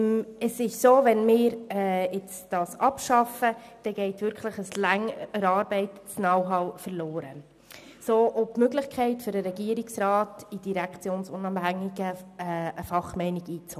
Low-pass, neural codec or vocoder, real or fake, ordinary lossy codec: 14.4 kHz; none; real; none